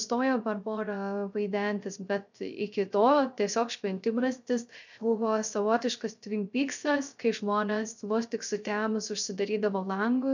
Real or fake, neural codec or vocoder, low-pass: fake; codec, 16 kHz, 0.3 kbps, FocalCodec; 7.2 kHz